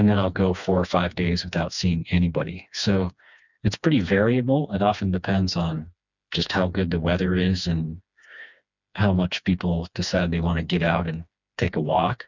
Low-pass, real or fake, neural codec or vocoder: 7.2 kHz; fake; codec, 16 kHz, 2 kbps, FreqCodec, smaller model